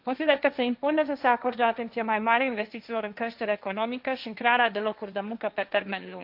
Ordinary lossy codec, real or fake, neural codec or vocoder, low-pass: AAC, 48 kbps; fake; codec, 16 kHz, 1.1 kbps, Voila-Tokenizer; 5.4 kHz